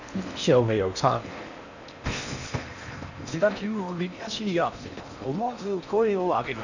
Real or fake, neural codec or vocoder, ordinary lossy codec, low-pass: fake; codec, 16 kHz in and 24 kHz out, 0.8 kbps, FocalCodec, streaming, 65536 codes; none; 7.2 kHz